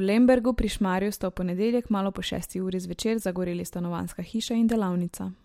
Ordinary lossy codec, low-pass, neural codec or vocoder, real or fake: MP3, 64 kbps; 19.8 kHz; none; real